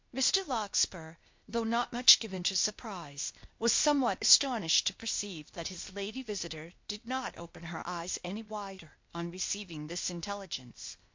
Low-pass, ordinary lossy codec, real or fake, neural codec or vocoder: 7.2 kHz; MP3, 48 kbps; fake; codec, 16 kHz, 0.8 kbps, ZipCodec